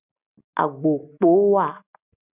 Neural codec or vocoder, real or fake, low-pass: none; real; 3.6 kHz